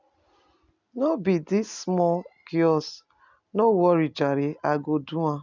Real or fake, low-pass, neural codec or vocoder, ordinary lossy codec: real; 7.2 kHz; none; none